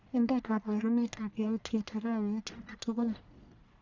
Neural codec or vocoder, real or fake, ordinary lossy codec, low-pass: codec, 44.1 kHz, 1.7 kbps, Pupu-Codec; fake; Opus, 64 kbps; 7.2 kHz